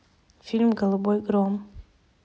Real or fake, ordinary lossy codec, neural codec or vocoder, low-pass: real; none; none; none